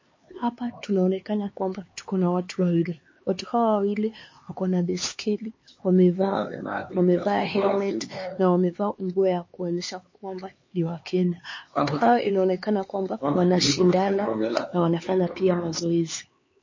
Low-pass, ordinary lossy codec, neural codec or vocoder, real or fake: 7.2 kHz; MP3, 32 kbps; codec, 16 kHz, 4 kbps, X-Codec, HuBERT features, trained on LibriSpeech; fake